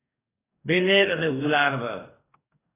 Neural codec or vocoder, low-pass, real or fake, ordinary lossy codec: codec, 44.1 kHz, 2.6 kbps, DAC; 3.6 kHz; fake; AAC, 16 kbps